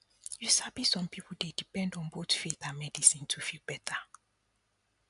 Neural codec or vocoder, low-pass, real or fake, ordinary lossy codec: none; 10.8 kHz; real; MP3, 96 kbps